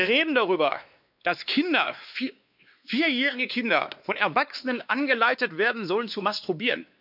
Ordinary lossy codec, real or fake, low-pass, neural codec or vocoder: none; fake; 5.4 kHz; codec, 16 kHz, 2 kbps, X-Codec, WavLM features, trained on Multilingual LibriSpeech